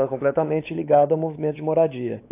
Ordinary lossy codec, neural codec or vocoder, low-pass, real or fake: AAC, 24 kbps; codec, 16 kHz, 4 kbps, X-Codec, WavLM features, trained on Multilingual LibriSpeech; 3.6 kHz; fake